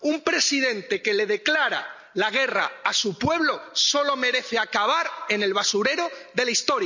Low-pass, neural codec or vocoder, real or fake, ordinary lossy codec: 7.2 kHz; none; real; none